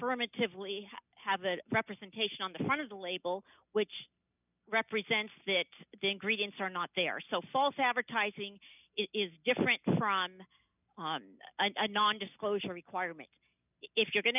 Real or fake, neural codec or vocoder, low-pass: real; none; 3.6 kHz